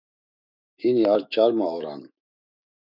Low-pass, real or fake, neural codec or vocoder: 5.4 kHz; fake; autoencoder, 48 kHz, 128 numbers a frame, DAC-VAE, trained on Japanese speech